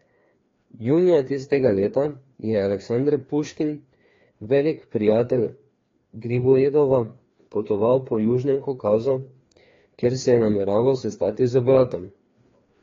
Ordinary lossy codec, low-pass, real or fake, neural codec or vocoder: AAC, 32 kbps; 7.2 kHz; fake; codec, 16 kHz, 2 kbps, FreqCodec, larger model